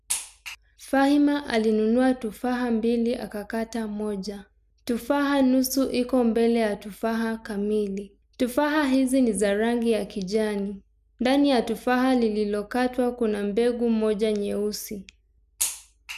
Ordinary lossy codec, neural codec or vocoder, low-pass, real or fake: none; none; 14.4 kHz; real